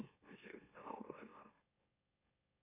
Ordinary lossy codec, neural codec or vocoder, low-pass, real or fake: MP3, 24 kbps; autoencoder, 44.1 kHz, a latent of 192 numbers a frame, MeloTTS; 3.6 kHz; fake